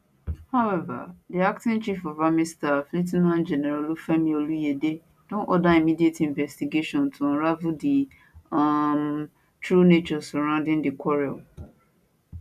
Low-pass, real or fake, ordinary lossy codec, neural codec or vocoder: 14.4 kHz; real; none; none